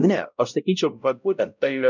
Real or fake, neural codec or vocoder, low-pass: fake; codec, 16 kHz, 0.5 kbps, X-Codec, WavLM features, trained on Multilingual LibriSpeech; 7.2 kHz